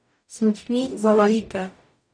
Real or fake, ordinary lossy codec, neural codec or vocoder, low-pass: fake; none; codec, 44.1 kHz, 0.9 kbps, DAC; 9.9 kHz